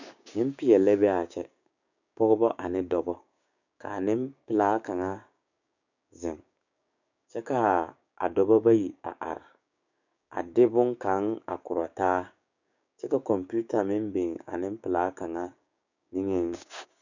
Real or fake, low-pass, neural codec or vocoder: fake; 7.2 kHz; codec, 16 kHz, 6 kbps, DAC